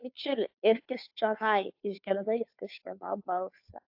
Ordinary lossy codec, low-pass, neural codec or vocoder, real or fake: AAC, 48 kbps; 5.4 kHz; codec, 16 kHz, 2 kbps, FunCodec, trained on Chinese and English, 25 frames a second; fake